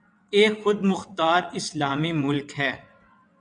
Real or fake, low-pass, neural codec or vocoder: fake; 9.9 kHz; vocoder, 22.05 kHz, 80 mel bands, WaveNeXt